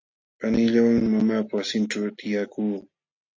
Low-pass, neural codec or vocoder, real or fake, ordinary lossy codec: 7.2 kHz; none; real; AAC, 48 kbps